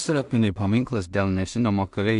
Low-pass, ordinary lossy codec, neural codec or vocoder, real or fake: 10.8 kHz; MP3, 64 kbps; codec, 16 kHz in and 24 kHz out, 0.4 kbps, LongCat-Audio-Codec, two codebook decoder; fake